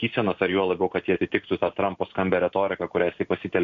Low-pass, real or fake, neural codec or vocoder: 7.2 kHz; real; none